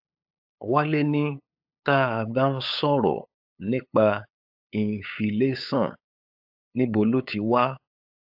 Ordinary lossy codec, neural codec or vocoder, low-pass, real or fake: AAC, 48 kbps; codec, 16 kHz, 8 kbps, FunCodec, trained on LibriTTS, 25 frames a second; 5.4 kHz; fake